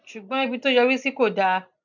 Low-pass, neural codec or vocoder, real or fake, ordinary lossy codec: 7.2 kHz; none; real; none